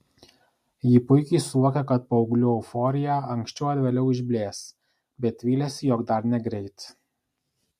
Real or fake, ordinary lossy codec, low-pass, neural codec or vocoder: real; MP3, 64 kbps; 14.4 kHz; none